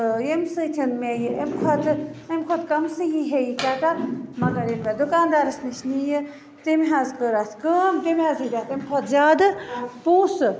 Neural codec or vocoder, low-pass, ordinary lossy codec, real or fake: none; none; none; real